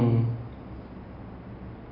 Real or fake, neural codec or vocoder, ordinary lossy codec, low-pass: real; none; none; 5.4 kHz